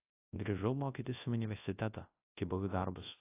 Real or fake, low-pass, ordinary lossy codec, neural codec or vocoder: fake; 3.6 kHz; AAC, 16 kbps; codec, 24 kHz, 0.9 kbps, WavTokenizer, large speech release